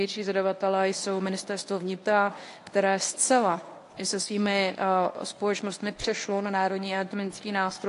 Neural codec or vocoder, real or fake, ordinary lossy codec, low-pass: codec, 24 kHz, 0.9 kbps, WavTokenizer, medium speech release version 1; fake; AAC, 48 kbps; 10.8 kHz